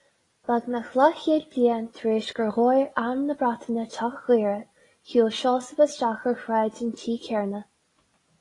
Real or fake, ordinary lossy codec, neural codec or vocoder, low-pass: real; AAC, 32 kbps; none; 10.8 kHz